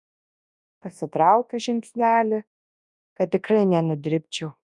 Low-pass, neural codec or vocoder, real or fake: 10.8 kHz; codec, 24 kHz, 0.9 kbps, WavTokenizer, large speech release; fake